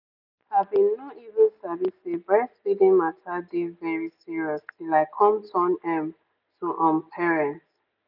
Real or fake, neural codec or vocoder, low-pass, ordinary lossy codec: real; none; 5.4 kHz; none